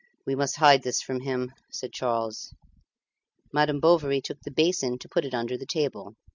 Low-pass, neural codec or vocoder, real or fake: 7.2 kHz; none; real